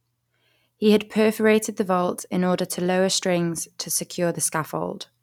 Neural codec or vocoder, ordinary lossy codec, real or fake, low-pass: none; none; real; 19.8 kHz